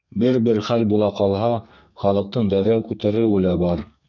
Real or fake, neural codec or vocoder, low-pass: fake; codec, 32 kHz, 1.9 kbps, SNAC; 7.2 kHz